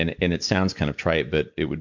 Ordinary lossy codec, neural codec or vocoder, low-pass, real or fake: MP3, 64 kbps; none; 7.2 kHz; real